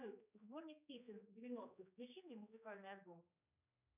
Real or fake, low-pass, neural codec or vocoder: fake; 3.6 kHz; codec, 16 kHz, 2 kbps, X-Codec, HuBERT features, trained on general audio